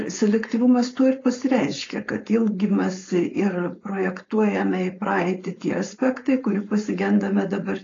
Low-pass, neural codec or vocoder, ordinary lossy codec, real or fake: 7.2 kHz; codec, 16 kHz, 4.8 kbps, FACodec; AAC, 32 kbps; fake